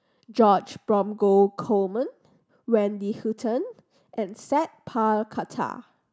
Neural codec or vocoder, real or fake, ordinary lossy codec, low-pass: none; real; none; none